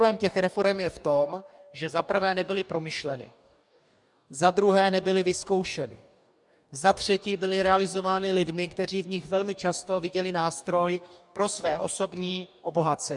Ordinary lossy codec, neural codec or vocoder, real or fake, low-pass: MP3, 96 kbps; codec, 44.1 kHz, 2.6 kbps, DAC; fake; 10.8 kHz